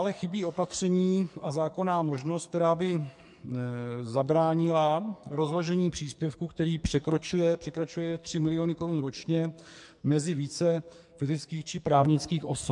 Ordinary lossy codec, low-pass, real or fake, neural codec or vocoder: MP3, 64 kbps; 10.8 kHz; fake; codec, 44.1 kHz, 2.6 kbps, SNAC